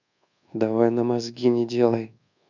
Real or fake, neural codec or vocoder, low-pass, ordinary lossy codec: fake; codec, 24 kHz, 1.2 kbps, DualCodec; 7.2 kHz; none